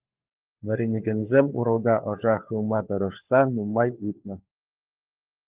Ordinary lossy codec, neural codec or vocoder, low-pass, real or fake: Opus, 32 kbps; codec, 16 kHz, 4 kbps, FunCodec, trained on LibriTTS, 50 frames a second; 3.6 kHz; fake